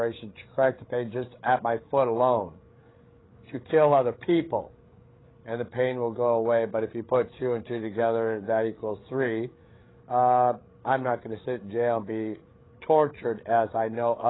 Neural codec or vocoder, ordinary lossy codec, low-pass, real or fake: codec, 16 kHz, 8 kbps, FreqCodec, larger model; AAC, 16 kbps; 7.2 kHz; fake